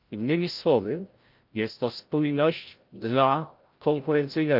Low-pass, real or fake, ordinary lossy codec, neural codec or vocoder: 5.4 kHz; fake; Opus, 24 kbps; codec, 16 kHz, 0.5 kbps, FreqCodec, larger model